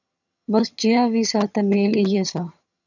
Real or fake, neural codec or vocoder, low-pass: fake; vocoder, 22.05 kHz, 80 mel bands, HiFi-GAN; 7.2 kHz